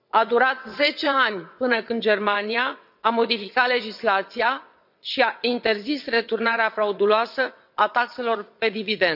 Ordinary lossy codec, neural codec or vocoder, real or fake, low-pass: none; vocoder, 22.05 kHz, 80 mel bands, WaveNeXt; fake; 5.4 kHz